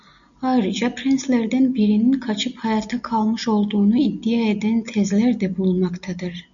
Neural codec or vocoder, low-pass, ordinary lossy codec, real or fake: none; 7.2 kHz; MP3, 96 kbps; real